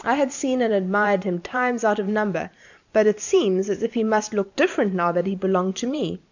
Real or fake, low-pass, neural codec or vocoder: fake; 7.2 kHz; vocoder, 44.1 kHz, 128 mel bands every 512 samples, BigVGAN v2